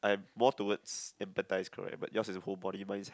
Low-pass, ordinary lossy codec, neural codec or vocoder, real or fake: none; none; none; real